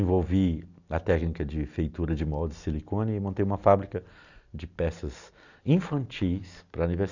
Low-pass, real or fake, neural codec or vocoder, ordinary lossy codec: 7.2 kHz; real; none; none